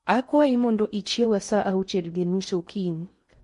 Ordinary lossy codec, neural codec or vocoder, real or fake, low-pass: MP3, 48 kbps; codec, 16 kHz in and 24 kHz out, 0.6 kbps, FocalCodec, streaming, 4096 codes; fake; 10.8 kHz